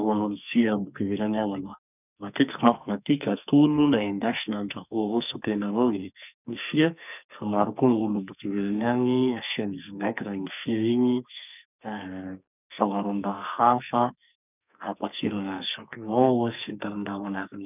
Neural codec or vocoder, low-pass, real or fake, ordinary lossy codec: codec, 44.1 kHz, 2.6 kbps, SNAC; 3.6 kHz; fake; none